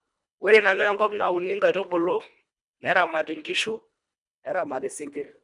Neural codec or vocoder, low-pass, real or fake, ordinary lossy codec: codec, 24 kHz, 1.5 kbps, HILCodec; 10.8 kHz; fake; none